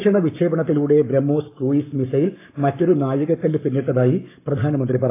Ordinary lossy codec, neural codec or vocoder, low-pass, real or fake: AAC, 24 kbps; codec, 44.1 kHz, 7.8 kbps, Pupu-Codec; 3.6 kHz; fake